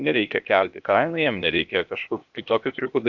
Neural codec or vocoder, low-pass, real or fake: codec, 16 kHz, 0.8 kbps, ZipCodec; 7.2 kHz; fake